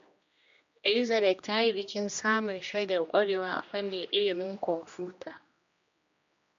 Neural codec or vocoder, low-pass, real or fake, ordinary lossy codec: codec, 16 kHz, 1 kbps, X-Codec, HuBERT features, trained on general audio; 7.2 kHz; fake; MP3, 48 kbps